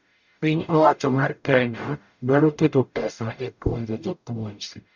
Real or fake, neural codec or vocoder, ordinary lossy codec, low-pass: fake; codec, 44.1 kHz, 0.9 kbps, DAC; none; 7.2 kHz